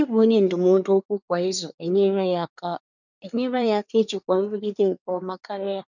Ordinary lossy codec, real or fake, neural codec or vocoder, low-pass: none; fake; codec, 24 kHz, 1 kbps, SNAC; 7.2 kHz